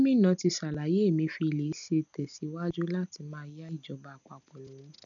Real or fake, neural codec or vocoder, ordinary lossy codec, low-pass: real; none; none; 7.2 kHz